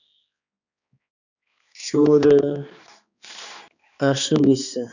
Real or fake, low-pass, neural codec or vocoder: fake; 7.2 kHz; codec, 16 kHz, 2 kbps, X-Codec, HuBERT features, trained on balanced general audio